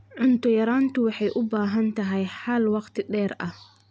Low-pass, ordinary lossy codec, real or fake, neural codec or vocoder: none; none; real; none